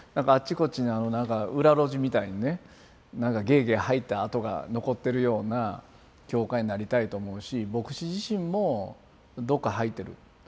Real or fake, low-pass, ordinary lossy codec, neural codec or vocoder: real; none; none; none